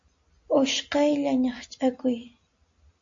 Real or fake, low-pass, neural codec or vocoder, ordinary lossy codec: real; 7.2 kHz; none; MP3, 48 kbps